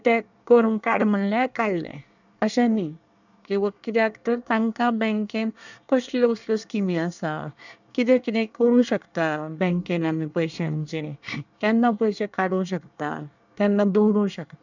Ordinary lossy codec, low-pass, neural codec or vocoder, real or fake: none; 7.2 kHz; codec, 24 kHz, 1 kbps, SNAC; fake